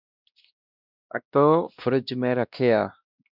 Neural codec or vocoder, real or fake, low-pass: codec, 16 kHz, 1 kbps, X-Codec, WavLM features, trained on Multilingual LibriSpeech; fake; 5.4 kHz